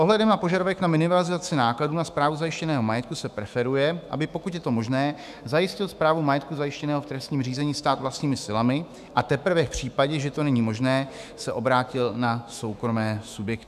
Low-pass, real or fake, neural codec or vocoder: 14.4 kHz; fake; autoencoder, 48 kHz, 128 numbers a frame, DAC-VAE, trained on Japanese speech